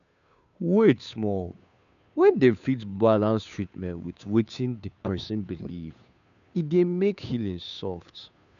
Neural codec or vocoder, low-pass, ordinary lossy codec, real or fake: codec, 16 kHz, 2 kbps, FunCodec, trained on Chinese and English, 25 frames a second; 7.2 kHz; none; fake